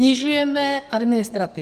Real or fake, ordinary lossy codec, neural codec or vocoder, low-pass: fake; Opus, 24 kbps; codec, 44.1 kHz, 2.6 kbps, SNAC; 14.4 kHz